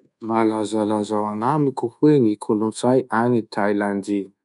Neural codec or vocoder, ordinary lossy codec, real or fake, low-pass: codec, 24 kHz, 1.2 kbps, DualCodec; none; fake; 10.8 kHz